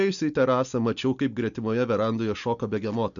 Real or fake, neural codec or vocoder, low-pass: real; none; 7.2 kHz